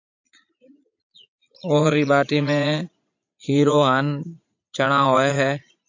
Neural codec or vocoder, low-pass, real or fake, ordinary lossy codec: vocoder, 22.05 kHz, 80 mel bands, Vocos; 7.2 kHz; fake; AAC, 48 kbps